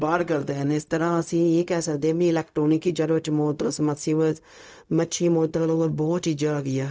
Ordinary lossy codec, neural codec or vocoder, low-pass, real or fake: none; codec, 16 kHz, 0.4 kbps, LongCat-Audio-Codec; none; fake